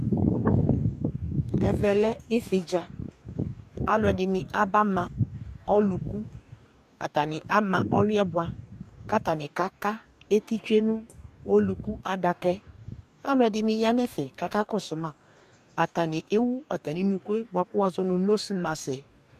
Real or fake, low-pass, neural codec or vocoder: fake; 14.4 kHz; codec, 44.1 kHz, 2.6 kbps, DAC